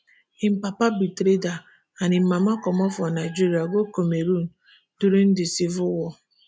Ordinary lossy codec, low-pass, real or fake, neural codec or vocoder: none; none; real; none